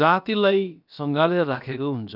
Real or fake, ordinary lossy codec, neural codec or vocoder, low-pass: fake; none; codec, 16 kHz, about 1 kbps, DyCAST, with the encoder's durations; 5.4 kHz